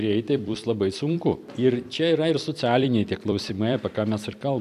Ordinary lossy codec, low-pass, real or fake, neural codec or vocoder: AAC, 96 kbps; 14.4 kHz; fake; vocoder, 44.1 kHz, 128 mel bands every 256 samples, BigVGAN v2